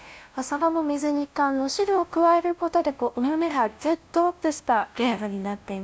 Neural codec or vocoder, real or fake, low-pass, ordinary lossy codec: codec, 16 kHz, 0.5 kbps, FunCodec, trained on LibriTTS, 25 frames a second; fake; none; none